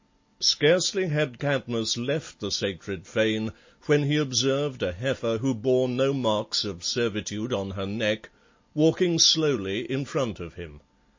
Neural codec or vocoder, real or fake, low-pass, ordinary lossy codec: none; real; 7.2 kHz; MP3, 32 kbps